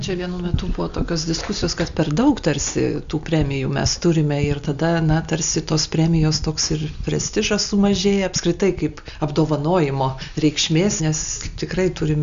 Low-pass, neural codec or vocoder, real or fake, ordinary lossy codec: 7.2 kHz; none; real; Opus, 64 kbps